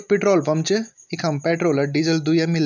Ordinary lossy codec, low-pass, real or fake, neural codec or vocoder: none; 7.2 kHz; real; none